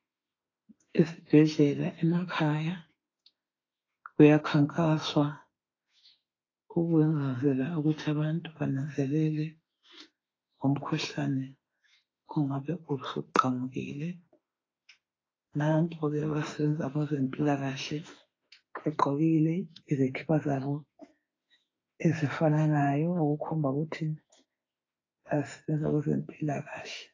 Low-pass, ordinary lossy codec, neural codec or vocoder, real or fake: 7.2 kHz; AAC, 32 kbps; autoencoder, 48 kHz, 32 numbers a frame, DAC-VAE, trained on Japanese speech; fake